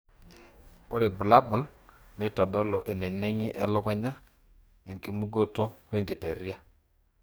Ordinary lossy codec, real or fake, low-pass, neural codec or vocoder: none; fake; none; codec, 44.1 kHz, 2.6 kbps, DAC